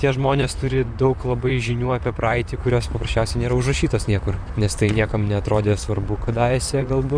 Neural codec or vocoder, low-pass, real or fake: vocoder, 44.1 kHz, 128 mel bands, Pupu-Vocoder; 9.9 kHz; fake